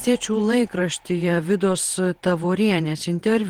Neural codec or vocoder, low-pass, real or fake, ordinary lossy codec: vocoder, 48 kHz, 128 mel bands, Vocos; 19.8 kHz; fake; Opus, 16 kbps